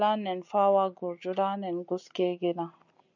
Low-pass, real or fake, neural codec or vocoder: 7.2 kHz; real; none